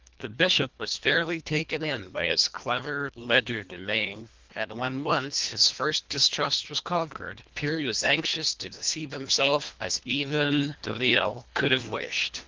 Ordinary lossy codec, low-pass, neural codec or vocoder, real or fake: Opus, 24 kbps; 7.2 kHz; codec, 24 kHz, 1.5 kbps, HILCodec; fake